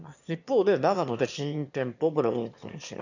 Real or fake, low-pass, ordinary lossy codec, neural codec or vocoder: fake; 7.2 kHz; none; autoencoder, 22.05 kHz, a latent of 192 numbers a frame, VITS, trained on one speaker